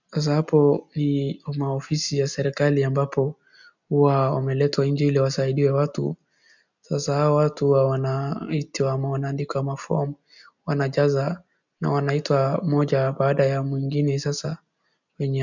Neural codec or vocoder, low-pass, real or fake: none; 7.2 kHz; real